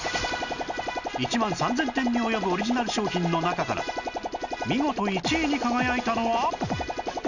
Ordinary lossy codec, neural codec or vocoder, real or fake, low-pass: none; none; real; 7.2 kHz